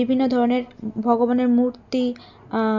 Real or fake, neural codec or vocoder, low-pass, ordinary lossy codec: real; none; 7.2 kHz; none